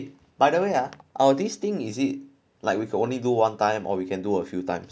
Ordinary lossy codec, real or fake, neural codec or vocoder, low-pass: none; real; none; none